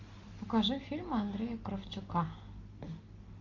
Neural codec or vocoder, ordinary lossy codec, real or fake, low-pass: none; AAC, 32 kbps; real; 7.2 kHz